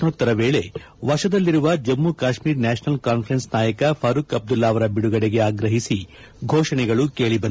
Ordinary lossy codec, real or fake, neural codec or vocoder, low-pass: none; real; none; none